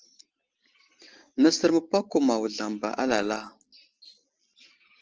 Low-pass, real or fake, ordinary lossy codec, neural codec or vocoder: 7.2 kHz; real; Opus, 16 kbps; none